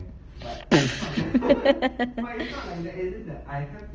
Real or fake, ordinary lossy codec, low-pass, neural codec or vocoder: real; Opus, 24 kbps; 7.2 kHz; none